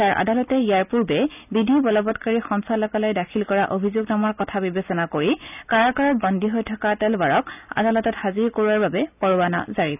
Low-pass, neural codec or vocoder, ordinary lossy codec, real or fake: 3.6 kHz; none; none; real